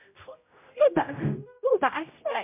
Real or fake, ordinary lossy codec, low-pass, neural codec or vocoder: fake; MP3, 32 kbps; 3.6 kHz; codec, 16 kHz, 0.5 kbps, X-Codec, HuBERT features, trained on general audio